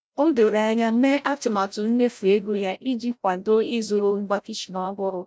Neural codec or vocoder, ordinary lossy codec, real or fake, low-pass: codec, 16 kHz, 0.5 kbps, FreqCodec, larger model; none; fake; none